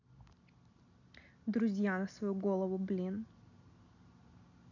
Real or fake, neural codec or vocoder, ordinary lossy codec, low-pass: real; none; none; 7.2 kHz